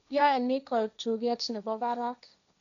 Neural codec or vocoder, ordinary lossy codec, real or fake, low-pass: codec, 16 kHz, 1.1 kbps, Voila-Tokenizer; none; fake; 7.2 kHz